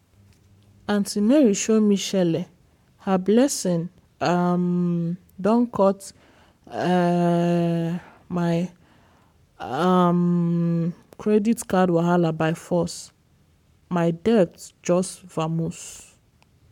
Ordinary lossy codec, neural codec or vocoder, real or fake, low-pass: MP3, 96 kbps; codec, 44.1 kHz, 7.8 kbps, Pupu-Codec; fake; 19.8 kHz